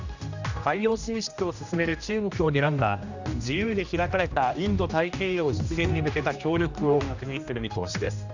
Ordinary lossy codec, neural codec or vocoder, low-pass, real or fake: none; codec, 16 kHz, 1 kbps, X-Codec, HuBERT features, trained on general audio; 7.2 kHz; fake